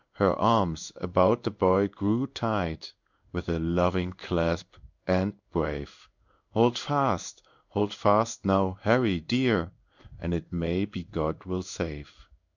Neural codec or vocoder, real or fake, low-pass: codec, 16 kHz in and 24 kHz out, 1 kbps, XY-Tokenizer; fake; 7.2 kHz